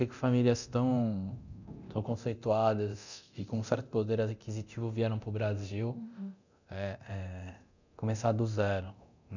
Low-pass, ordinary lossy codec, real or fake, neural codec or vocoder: 7.2 kHz; none; fake; codec, 24 kHz, 0.9 kbps, DualCodec